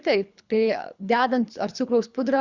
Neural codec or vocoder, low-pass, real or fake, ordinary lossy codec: codec, 24 kHz, 3 kbps, HILCodec; 7.2 kHz; fake; Opus, 64 kbps